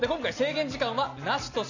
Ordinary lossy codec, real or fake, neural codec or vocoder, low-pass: none; real; none; 7.2 kHz